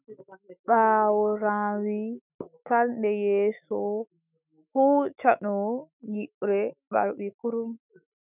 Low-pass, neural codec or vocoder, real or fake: 3.6 kHz; autoencoder, 48 kHz, 128 numbers a frame, DAC-VAE, trained on Japanese speech; fake